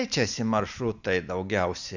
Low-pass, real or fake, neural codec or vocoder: 7.2 kHz; real; none